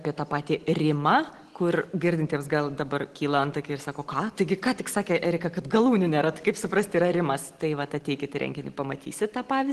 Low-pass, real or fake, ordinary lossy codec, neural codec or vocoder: 10.8 kHz; real; Opus, 16 kbps; none